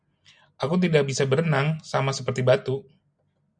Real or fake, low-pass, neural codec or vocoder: real; 9.9 kHz; none